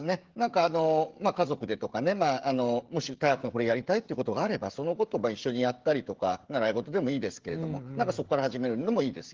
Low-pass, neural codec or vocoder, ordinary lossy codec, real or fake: 7.2 kHz; codec, 16 kHz, 16 kbps, FreqCodec, smaller model; Opus, 16 kbps; fake